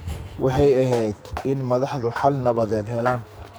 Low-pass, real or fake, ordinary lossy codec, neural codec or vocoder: none; fake; none; codec, 44.1 kHz, 2.6 kbps, SNAC